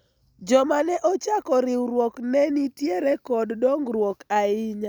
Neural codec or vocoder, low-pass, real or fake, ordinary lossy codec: none; none; real; none